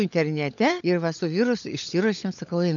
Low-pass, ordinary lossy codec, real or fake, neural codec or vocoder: 7.2 kHz; MP3, 96 kbps; fake; codec, 16 kHz, 16 kbps, FunCodec, trained on LibriTTS, 50 frames a second